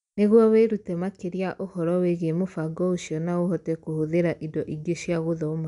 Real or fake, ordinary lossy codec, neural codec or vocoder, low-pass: real; none; none; 10.8 kHz